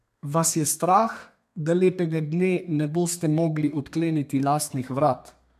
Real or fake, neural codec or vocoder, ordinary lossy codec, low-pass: fake; codec, 32 kHz, 1.9 kbps, SNAC; MP3, 96 kbps; 14.4 kHz